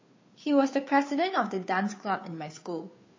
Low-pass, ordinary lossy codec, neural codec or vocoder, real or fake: 7.2 kHz; MP3, 32 kbps; codec, 16 kHz, 2 kbps, FunCodec, trained on Chinese and English, 25 frames a second; fake